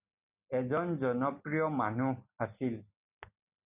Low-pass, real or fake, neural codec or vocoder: 3.6 kHz; real; none